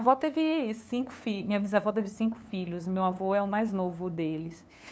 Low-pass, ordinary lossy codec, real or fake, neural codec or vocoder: none; none; fake; codec, 16 kHz, 2 kbps, FunCodec, trained on LibriTTS, 25 frames a second